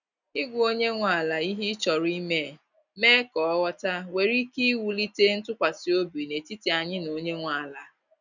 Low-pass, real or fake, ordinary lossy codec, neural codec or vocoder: 7.2 kHz; real; none; none